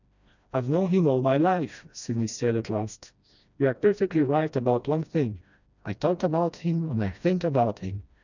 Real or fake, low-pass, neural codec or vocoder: fake; 7.2 kHz; codec, 16 kHz, 1 kbps, FreqCodec, smaller model